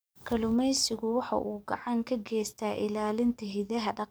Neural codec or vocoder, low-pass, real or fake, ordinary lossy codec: codec, 44.1 kHz, 7.8 kbps, DAC; none; fake; none